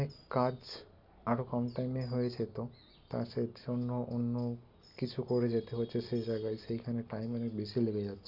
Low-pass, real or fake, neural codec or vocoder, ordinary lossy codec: 5.4 kHz; real; none; none